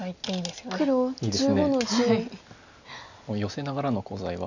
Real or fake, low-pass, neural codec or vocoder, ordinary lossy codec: real; 7.2 kHz; none; none